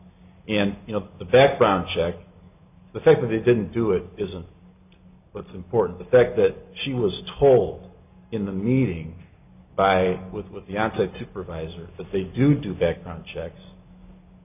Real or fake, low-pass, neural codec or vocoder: real; 3.6 kHz; none